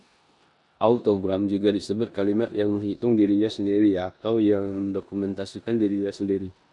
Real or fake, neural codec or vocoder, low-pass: fake; codec, 16 kHz in and 24 kHz out, 0.9 kbps, LongCat-Audio-Codec, four codebook decoder; 10.8 kHz